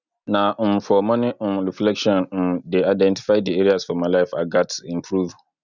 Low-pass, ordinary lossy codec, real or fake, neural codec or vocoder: 7.2 kHz; none; real; none